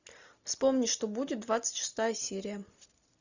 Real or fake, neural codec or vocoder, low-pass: real; none; 7.2 kHz